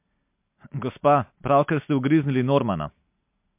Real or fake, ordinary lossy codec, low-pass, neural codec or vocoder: real; MP3, 32 kbps; 3.6 kHz; none